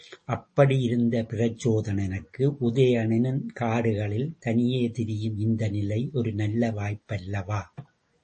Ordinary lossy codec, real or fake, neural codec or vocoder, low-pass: MP3, 32 kbps; real; none; 10.8 kHz